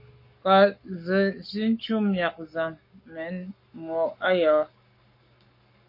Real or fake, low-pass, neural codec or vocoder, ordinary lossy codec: fake; 5.4 kHz; codec, 44.1 kHz, 7.8 kbps, Pupu-Codec; MP3, 32 kbps